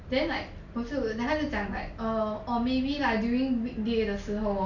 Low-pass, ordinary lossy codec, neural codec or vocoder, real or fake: 7.2 kHz; none; none; real